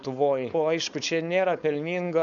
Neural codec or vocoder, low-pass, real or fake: codec, 16 kHz, 4.8 kbps, FACodec; 7.2 kHz; fake